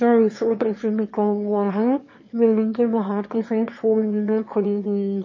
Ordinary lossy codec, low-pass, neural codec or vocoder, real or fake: MP3, 32 kbps; 7.2 kHz; autoencoder, 22.05 kHz, a latent of 192 numbers a frame, VITS, trained on one speaker; fake